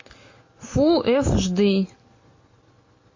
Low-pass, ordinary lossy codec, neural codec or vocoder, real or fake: 7.2 kHz; MP3, 32 kbps; none; real